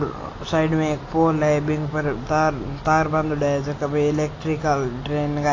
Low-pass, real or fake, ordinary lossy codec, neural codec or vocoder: 7.2 kHz; real; AAC, 32 kbps; none